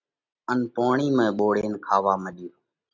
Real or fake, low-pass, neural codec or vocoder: real; 7.2 kHz; none